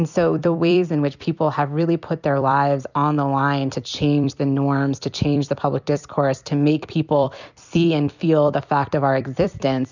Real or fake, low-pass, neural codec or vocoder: fake; 7.2 kHz; vocoder, 44.1 kHz, 128 mel bands every 256 samples, BigVGAN v2